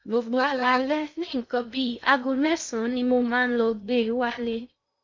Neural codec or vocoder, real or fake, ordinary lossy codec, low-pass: codec, 16 kHz in and 24 kHz out, 0.6 kbps, FocalCodec, streaming, 2048 codes; fake; MP3, 64 kbps; 7.2 kHz